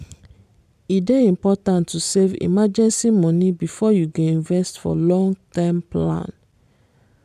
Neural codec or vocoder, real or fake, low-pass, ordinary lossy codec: vocoder, 44.1 kHz, 128 mel bands every 512 samples, BigVGAN v2; fake; 14.4 kHz; none